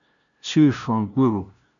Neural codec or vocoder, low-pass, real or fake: codec, 16 kHz, 0.5 kbps, FunCodec, trained on LibriTTS, 25 frames a second; 7.2 kHz; fake